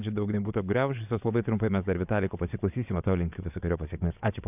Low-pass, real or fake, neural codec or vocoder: 3.6 kHz; fake; vocoder, 22.05 kHz, 80 mel bands, WaveNeXt